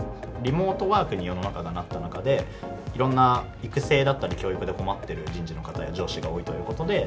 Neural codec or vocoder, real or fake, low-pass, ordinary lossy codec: none; real; none; none